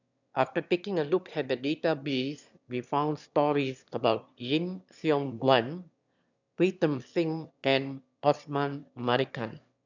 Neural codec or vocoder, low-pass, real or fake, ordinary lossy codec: autoencoder, 22.05 kHz, a latent of 192 numbers a frame, VITS, trained on one speaker; 7.2 kHz; fake; none